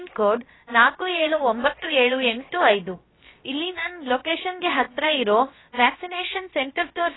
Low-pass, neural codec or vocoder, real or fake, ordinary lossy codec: 7.2 kHz; codec, 16 kHz, about 1 kbps, DyCAST, with the encoder's durations; fake; AAC, 16 kbps